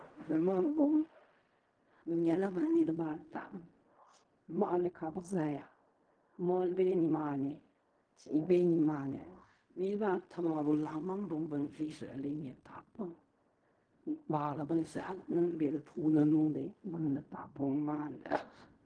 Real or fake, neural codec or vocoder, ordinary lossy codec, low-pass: fake; codec, 16 kHz in and 24 kHz out, 0.4 kbps, LongCat-Audio-Codec, fine tuned four codebook decoder; Opus, 24 kbps; 9.9 kHz